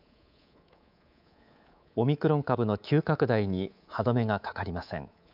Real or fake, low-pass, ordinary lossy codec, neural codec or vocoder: fake; 5.4 kHz; none; codec, 24 kHz, 3.1 kbps, DualCodec